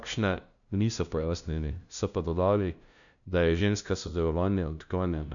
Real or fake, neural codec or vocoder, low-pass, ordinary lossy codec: fake; codec, 16 kHz, 0.5 kbps, FunCodec, trained on LibriTTS, 25 frames a second; 7.2 kHz; none